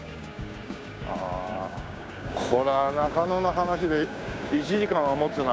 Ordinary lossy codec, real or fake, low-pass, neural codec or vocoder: none; fake; none; codec, 16 kHz, 6 kbps, DAC